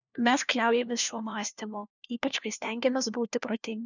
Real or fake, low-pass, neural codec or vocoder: fake; 7.2 kHz; codec, 16 kHz, 1 kbps, FunCodec, trained on LibriTTS, 50 frames a second